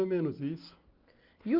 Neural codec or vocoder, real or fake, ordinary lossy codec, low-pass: none; real; Opus, 24 kbps; 5.4 kHz